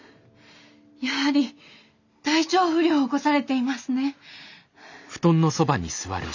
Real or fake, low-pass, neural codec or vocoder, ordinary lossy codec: real; 7.2 kHz; none; none